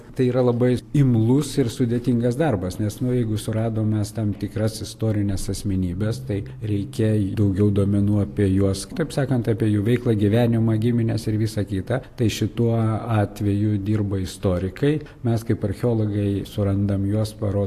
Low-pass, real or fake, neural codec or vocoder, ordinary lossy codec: 14.4 kHz; real; none; AAC, 64 kbps